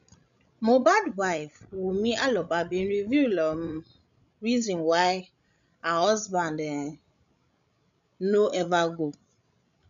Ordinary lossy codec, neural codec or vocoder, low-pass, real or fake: none; codec, 16 kHz, 16 kbps, FreqCodec, larger model; 7.2 kHz; fake